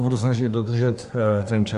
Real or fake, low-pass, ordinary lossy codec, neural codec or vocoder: fake; 10.8 kHz; Opus, 64 kbps; codec, 24 kHz, 1 kbps, SNAC